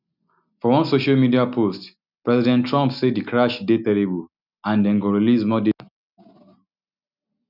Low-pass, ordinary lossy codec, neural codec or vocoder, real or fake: 5.4 kHz; none; none; real